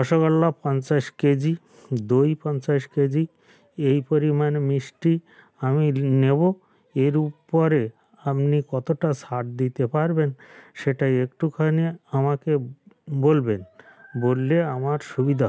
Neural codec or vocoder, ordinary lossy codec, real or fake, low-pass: none; none; real; none